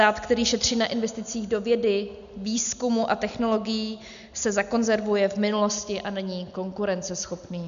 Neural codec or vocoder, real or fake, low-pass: none; real; 7.2 kHz